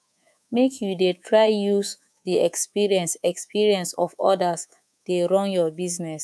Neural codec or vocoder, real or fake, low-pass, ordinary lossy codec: codec, 24 kHz, 3.1 kbps, DualCodec; fake; none; none